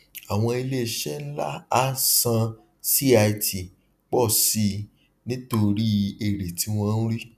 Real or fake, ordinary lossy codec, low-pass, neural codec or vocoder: real; none; 14.4 kHz; none